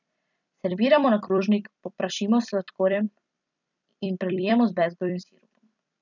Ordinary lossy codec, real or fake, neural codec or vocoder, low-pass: none; fake; vocoder, 44.1 kHz, 128 mel bands every 256 samples, BigVGAN v2; 7.2 kHz